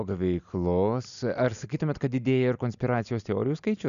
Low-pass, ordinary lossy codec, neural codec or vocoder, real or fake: 7.2 kHz; MP3, 96 kbps; none; real